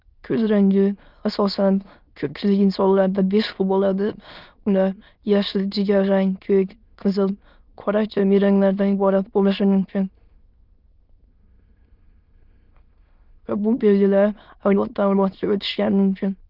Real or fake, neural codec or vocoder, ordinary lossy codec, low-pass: fake; autoencoder, 22.05 kHz, a latent of 192 numbers a frame, VITS, trained on many speakers; Opus, 24 kbps; 5.4 kHz